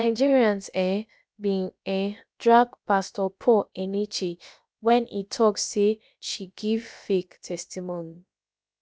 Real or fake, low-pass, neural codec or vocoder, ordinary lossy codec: fake; none; codec, 16 kHz, about 1 kbps, DyCAST, with the encoder's durations; none